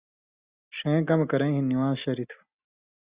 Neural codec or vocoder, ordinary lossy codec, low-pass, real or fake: none; Opus, 64 kbps; 3.6 kHz; real